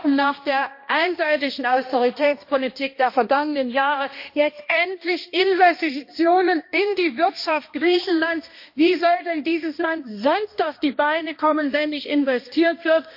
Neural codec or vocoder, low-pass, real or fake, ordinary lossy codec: codec, 16 kHz, 1 kbps, X-Codec, HuBERT features, trained on balanced general audio; 5.4 kHz; fake; MP3, 32 kbps